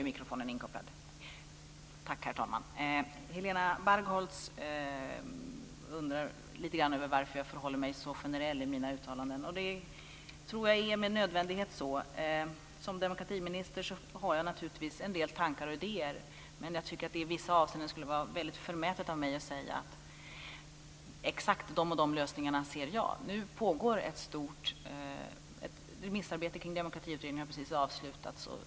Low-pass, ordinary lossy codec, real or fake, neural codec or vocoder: none; none; real; none